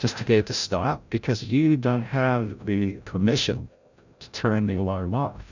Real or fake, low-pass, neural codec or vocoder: fake; 7.2 kHz; codec, 16 kHz, 0.5 kbps, FreqCodec, larger model